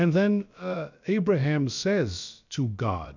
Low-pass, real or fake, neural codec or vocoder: 7.2 kHz; fake; codec, 16 kHz, about 1 kbps, DyCAST, with the encoder's durations